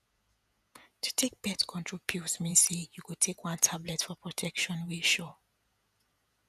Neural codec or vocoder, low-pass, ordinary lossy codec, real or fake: vocoder, 48 kHz, 128 mel bands, Vocos; 14.4 kHz; none; fake